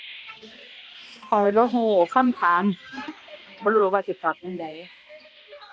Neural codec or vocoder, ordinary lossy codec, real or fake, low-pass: codec, 16 kHz, 1 kbps, X-Codec, HuBERT features, trained on balanced general audio; none; fake; none